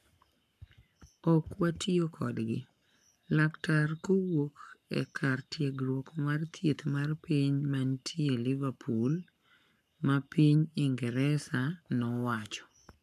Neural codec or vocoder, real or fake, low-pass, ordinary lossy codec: codec, 44.1 kHz, 7.8 kbps, Pupu-Codec; fake; 14.4 kHz; none